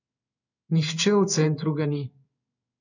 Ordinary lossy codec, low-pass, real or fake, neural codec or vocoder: none; 7.2 kHz; fake; codec, 16 kHz in and 24 kHz out, 1 kbps, XY-Tokenizer